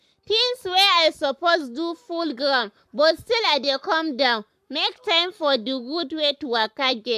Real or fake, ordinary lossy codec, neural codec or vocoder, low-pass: fake; AAC, 96 kbps; codec, 44.1 kHz, 7.8 kbps, Pupu-Codec; 14.4 kHz